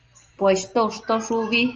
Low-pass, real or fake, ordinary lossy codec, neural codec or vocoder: 7.2 kHz; real; Opus, 24 kbps; none